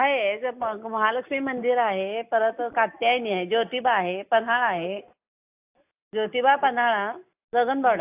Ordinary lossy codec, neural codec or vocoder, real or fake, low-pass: AAC, 32 kbps; none; real; 3.6 kHz